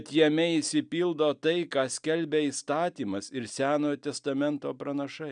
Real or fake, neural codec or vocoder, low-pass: real; none; 9.9 kHz